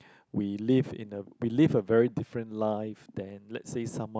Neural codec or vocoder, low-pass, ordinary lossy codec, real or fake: none; none; none; real